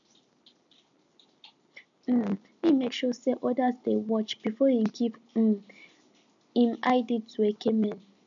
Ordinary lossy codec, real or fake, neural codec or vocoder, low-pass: none; real; none; 7.2 kHz